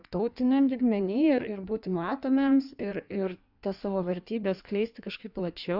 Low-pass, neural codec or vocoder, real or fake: 5.4 kHz; codec, 16 kHz in and 24 kHz out, 1.1 kbps, FireRedTTS-2 codec; fake